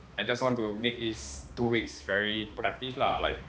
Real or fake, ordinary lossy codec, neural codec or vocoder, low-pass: fake; none; codec, 16 kHz, 2 kbps, X-Codec, HuBERT features, trained on balanced general audio; none